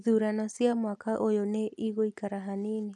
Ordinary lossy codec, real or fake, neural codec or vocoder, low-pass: none; real; none; none